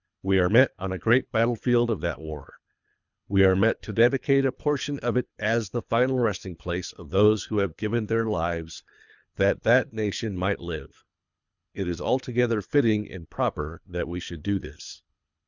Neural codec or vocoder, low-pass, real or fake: codec, 24 kHz, 3 kbps, HILCodec; 7.2 kHz; fake